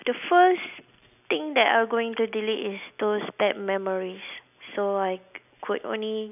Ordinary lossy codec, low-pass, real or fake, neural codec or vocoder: none; 3.6 kHz; real; none